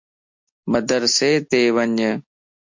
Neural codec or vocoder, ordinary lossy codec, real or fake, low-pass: none; MP3, 48 kbps; real; 7.2 kHz